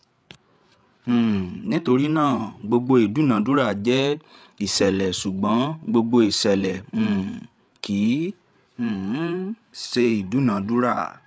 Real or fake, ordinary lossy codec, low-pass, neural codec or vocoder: fake; none; none; codec, 16 kHz, 8 kbps, FreqCodec, larger model